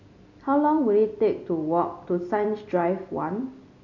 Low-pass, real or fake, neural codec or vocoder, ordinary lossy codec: 7.2 kHz; real; none; none